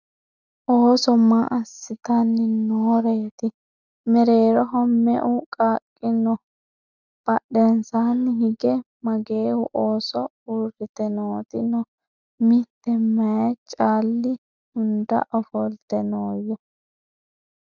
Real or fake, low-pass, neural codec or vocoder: real; 7.2 kHz; none